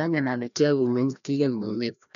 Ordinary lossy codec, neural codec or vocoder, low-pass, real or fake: none; codec, 16 kHz, 1 kbps, FreqCodec, larger model; 7.2 kHz; fake